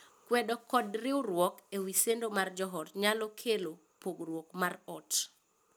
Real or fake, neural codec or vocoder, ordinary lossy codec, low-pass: real; none; none; none